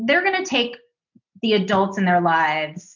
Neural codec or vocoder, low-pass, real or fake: none; 7.2 kHz; real